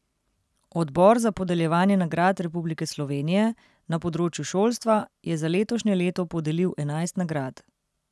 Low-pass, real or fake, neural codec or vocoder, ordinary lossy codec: none; real; none; none